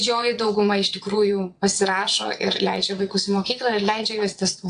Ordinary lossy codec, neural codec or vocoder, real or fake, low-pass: AAC, 64 kbps; vocoder, 22.05 kHz, 80 mel bands, WaveNeXt; fake; 9.9 kHz